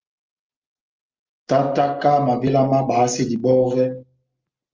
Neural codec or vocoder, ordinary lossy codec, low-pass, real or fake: none; Opus, 32 kbps; 7.2 kHz; real